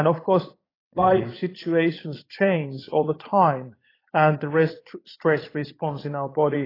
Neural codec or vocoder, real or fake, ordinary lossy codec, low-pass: vocoder, 22.05 kHz, 80 mel bands, Vocos; fake; AAC, 24 kbps; 5.4 kHz